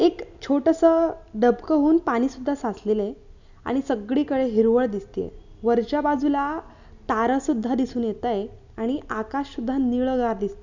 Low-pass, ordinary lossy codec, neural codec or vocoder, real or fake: 7.2 kHz; none; none; real